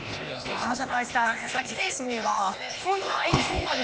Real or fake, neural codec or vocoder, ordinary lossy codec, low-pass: fake; codec, 16 kHz, 0.8 kbps, ZipCodec; none; none